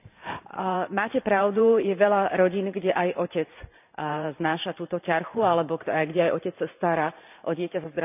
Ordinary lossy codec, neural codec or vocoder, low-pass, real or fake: none; vocoder, 44.1 kHz, 128 mel bands every 512 samples, BigVGAN v2; 3.6 kHz; fake